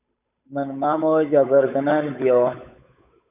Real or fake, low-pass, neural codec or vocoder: fake; 3.6 kHz; codec, 16 kHz, 8 kbps, FunCodec, trained on Chinese and English, 25 frames a second